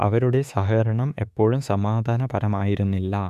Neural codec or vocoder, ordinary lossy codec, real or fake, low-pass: autoencoder, 48 kHz, 32 numbers a frame, DAC-VAE, trained on Japanese speech; none; fake; 14.4 kHz